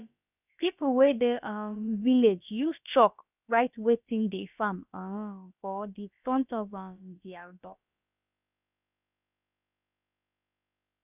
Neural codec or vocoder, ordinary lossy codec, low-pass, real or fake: codec, 16 kHz, about 1 kbps, DyCAST, with the encoder's durations; none; 3.6 kHz; fake